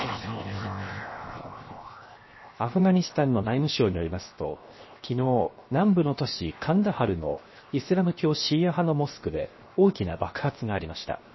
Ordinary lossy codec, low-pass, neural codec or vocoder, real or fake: MP3, 24 kbps; 7.2 kHz; codec, 16 kHz, 0.7 kbps, FocalCodec; fake